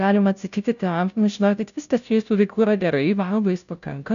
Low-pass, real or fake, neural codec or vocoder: 7.2 kHz; fake; codec, 16 kHz, 0.5 kbps, FunCodec, trained on Chinese and English, 25 frames a second